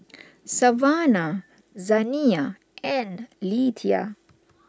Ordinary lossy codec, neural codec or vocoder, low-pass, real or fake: none; none; none; real